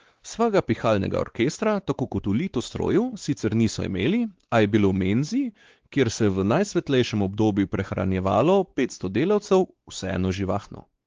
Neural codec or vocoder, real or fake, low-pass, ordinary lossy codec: codec, 16 kHz, 4 kbps, X-Codec, WavLM features, trained on Multilingual LibriSpeech; fake; 7.2 kHz; Opus, 16 kbps